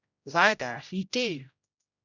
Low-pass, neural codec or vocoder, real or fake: 7.2 kHz; codec, 16 kHz, 0.5 kbps, X-Codec, HuBERT features, trained on general audio; fake